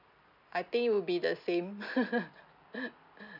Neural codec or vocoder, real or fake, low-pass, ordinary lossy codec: none; real; 5.4 kHz; none